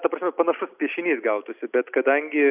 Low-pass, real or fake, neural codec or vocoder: 3.6 kHz; real; none